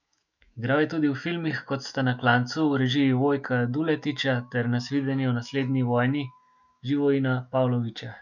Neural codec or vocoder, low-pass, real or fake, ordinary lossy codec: autoencoder, 48 kHz, 128 numbers a frame, DAC-VAE, trained on Japanese speech; 7.2 kHz; fake; none